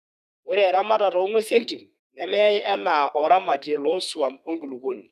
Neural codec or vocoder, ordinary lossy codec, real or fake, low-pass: codec, 32 kHz, 1.9 kbps, SNAC; none; fake; 14.4 kHz